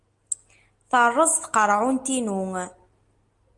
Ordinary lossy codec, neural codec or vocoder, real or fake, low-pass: Opus, 32 kbps; none; real; 10.8 kHz